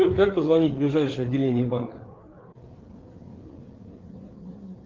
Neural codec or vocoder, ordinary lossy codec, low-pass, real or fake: codec, 16 kHz, 4 kbps, FreqCodec, larger model; Opus, 16 kbps; 7.2 kHz; fake